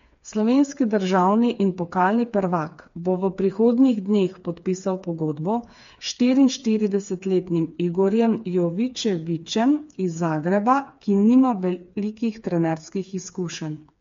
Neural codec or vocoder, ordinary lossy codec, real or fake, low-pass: codec, 16 kHz, 4 kbps, FreqCodec, smaller model; MP3, 48 kbps; fake; 7.2 kHz